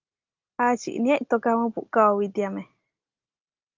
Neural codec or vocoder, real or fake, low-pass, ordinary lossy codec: none; real; 7.2 kHz; Opus, 24 kbps